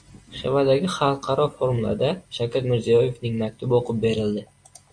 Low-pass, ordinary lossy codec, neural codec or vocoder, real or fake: 9.9 kHz; AAC, 64 kbps; none; real